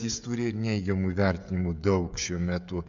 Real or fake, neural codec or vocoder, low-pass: fake; codec, 16 kHz, 16 kbps, FreqCodec, smaller model; 7.2 kHz